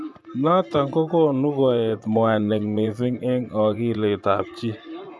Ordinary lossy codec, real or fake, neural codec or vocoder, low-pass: none; fake; vocoder, 24 kHz, 100 mel bands, Vocos; none